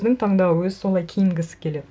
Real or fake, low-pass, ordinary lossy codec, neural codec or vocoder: real; none; none; none